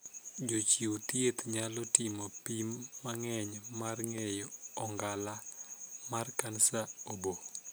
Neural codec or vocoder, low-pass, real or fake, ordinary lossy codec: none; none; real; none